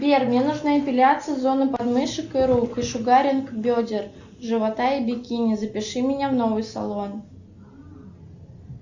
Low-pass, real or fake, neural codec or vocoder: 7.2 kHz; real; none